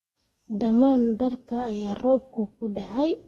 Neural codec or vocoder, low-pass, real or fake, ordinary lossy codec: codec, 44.1 kHz, 2.6 kbps, DAC; 19.8 kHz; fake; AAC, 32 kbps